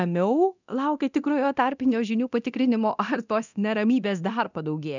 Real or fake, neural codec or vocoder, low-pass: fake; codec, 24 kHz, 0.9 kbps, DualCodec; 7.2 kHz